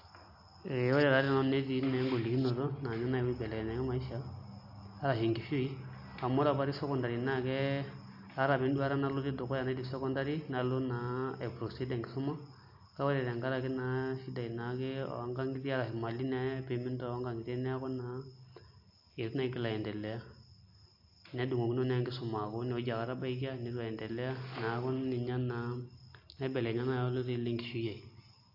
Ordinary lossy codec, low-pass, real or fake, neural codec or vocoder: AAC, 48 kbps; 5.4 kHz; real; none